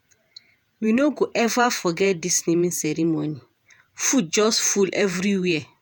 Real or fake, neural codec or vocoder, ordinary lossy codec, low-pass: fake; vocoder, 48 kHz, 128 mel bands, Vocos; none; none